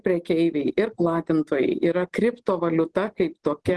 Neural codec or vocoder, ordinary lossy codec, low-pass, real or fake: none; Opus, 16 kbps; 10.8 kHz; real